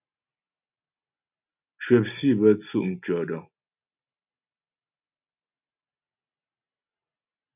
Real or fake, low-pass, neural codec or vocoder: real; 3.6 kHz; none